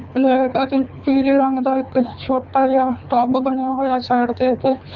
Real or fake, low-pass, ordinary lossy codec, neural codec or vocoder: fake; 7.2 kHz; none; codec, 24 kHz, 3 kbps, HILCodec